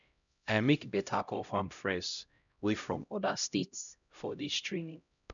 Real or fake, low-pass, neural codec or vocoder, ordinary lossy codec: fake; 7.2 kHz; codec, 16 kHz, 0.5 kbps, X-Codec, HuBERT features, trained on LibriSpeech; none